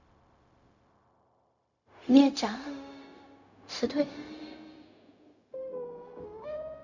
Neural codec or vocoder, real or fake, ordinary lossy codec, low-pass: codec, 16 kHz, 0.4 kbps, LongCat-Audio-Codec; fake; none; 7.2 kHz